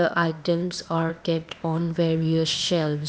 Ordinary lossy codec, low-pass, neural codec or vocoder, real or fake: none; none; codec, 16 kHz, 0.8 kbps, ZipCodec; fake